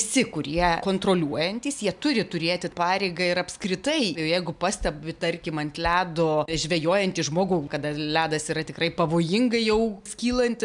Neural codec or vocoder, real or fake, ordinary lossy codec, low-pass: none; real; MP3, 96 kbps; 10.8 kHz